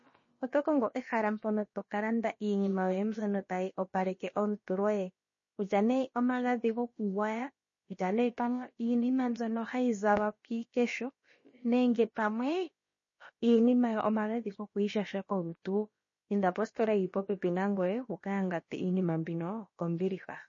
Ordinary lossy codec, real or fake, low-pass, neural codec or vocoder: MP3, 32 kbps; fake; 7.2 kHz; codec, 16 kHz, about 1 kbps, DyCAST, with the encoder's durations